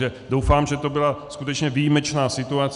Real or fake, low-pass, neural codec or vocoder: real; 10.8 kHz; none